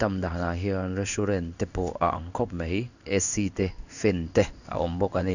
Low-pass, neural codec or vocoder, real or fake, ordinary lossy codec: 7.2 kHz; codec, 16 kHz in and 24 kHz out, 1 kbps, XY-Tokenizer; fake; none